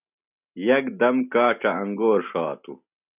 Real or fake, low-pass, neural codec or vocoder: real; 3.6 kHz; none